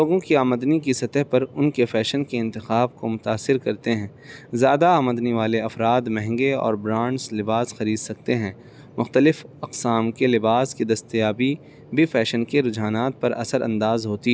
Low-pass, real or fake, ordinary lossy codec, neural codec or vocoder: none; real; none; none